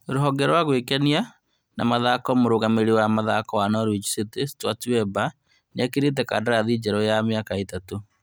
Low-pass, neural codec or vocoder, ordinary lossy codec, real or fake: none; none; none; real